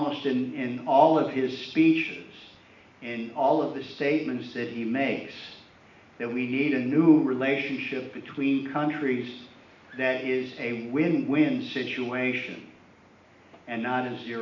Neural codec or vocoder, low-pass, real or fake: none; 7.2 kHz; real